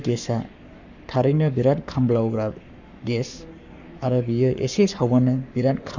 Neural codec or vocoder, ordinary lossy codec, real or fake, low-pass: codec, 44.1 kHz, 7.8 kbps, Pupu-Codec; none; fake; 7.2 kHz